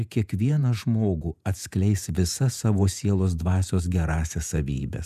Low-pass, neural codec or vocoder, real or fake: 14.4 kHz; vocoder, 48 kHz, 128 mel bands, Vocos; fake